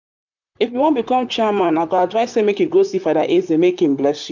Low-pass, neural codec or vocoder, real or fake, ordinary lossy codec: 7.2 kHz; vocoder, 44.1 kHz, 128 mel bands, Pupu-Vocoder; fake; none